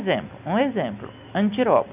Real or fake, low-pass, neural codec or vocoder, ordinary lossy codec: real; 3.6 kHz; none; none